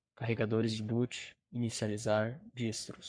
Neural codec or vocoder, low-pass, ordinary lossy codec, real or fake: codec, 44.1 kHz, 3.4 kbps, Pupu-Codec; 9.9 kHz; MP3, 64 kbps; fake